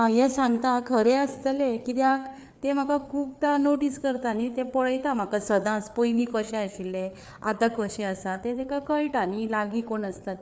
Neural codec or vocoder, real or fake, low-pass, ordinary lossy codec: codec, 16 kHz, 4 kbps, FreqCodec, larger model; fake; none; none